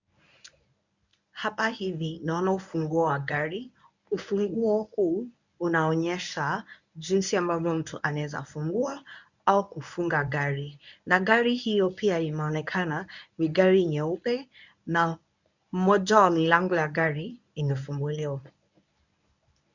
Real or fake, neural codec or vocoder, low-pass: fake; codec, 24 kHz, 0.9 kbps, WavTokenizer, medium speech release version 1; 7.2 kHz